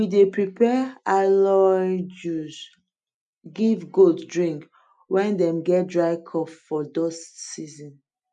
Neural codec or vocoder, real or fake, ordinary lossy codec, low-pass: none; real; none; 9.9 kHz